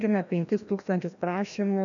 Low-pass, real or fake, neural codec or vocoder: 7.2 kHz; fake; codec, 16 kHz, 1 kbps, FreqCodec, larger model